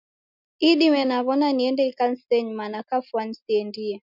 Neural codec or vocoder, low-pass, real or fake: none; 5.4 kHz; real